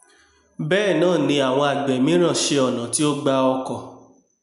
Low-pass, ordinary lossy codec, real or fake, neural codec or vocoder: 10.8 kHz; none; real; none